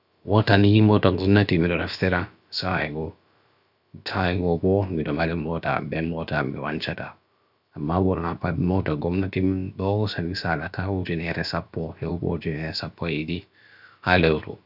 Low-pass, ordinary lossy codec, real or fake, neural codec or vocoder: 5.4 kHz; none; fake; codec, 16 kHz, about 1 kbps, DyCAST, with the encoder's durations